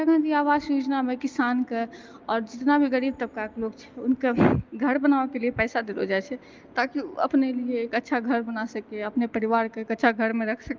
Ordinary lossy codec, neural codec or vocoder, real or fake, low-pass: Opus, 32 kbps; none; real; 7.2 kHz